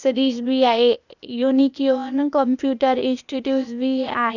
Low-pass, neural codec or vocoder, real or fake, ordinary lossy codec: 7.2 kHz; codec, 16 kHz, 0.8 kbps, ZipCodec; fake; none